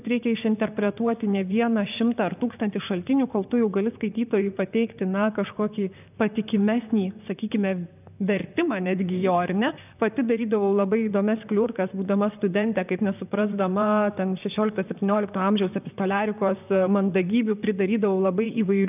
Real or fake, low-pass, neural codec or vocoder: fake; 3.6 kHz; vocoder, 44.1 kHz, 128 mel bands, Pupu-Vocoder